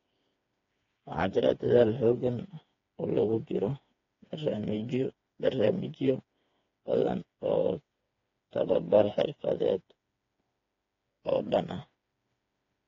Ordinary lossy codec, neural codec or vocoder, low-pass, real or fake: AAC, 32 kbps; codec, 16 kHz, 4 kbps, FreqCodec, smaller model; 7.2 kHz; fake